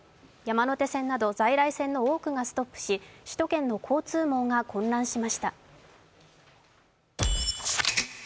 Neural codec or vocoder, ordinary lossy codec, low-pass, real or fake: none; none; none; real